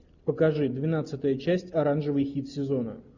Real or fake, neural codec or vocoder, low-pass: real; none; 7.2 kHz